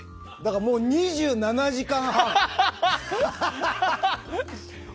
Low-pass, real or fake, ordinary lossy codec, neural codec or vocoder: none; real; none; none